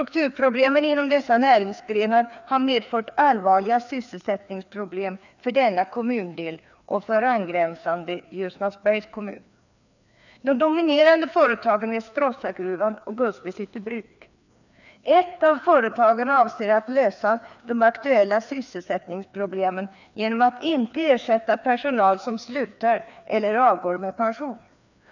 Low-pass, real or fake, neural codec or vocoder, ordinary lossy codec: 7.2 kHz; fake; codec, 16 kHz, 2 kbps, FreqCodec, larger model; none